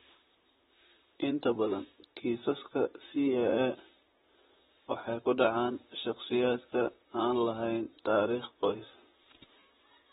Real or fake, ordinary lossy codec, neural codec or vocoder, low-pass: fake; AAC, 16 kbps; vocoder, 44.1 kHz, 128 mel bands, Pupu-Vocoder; 19.8 kHz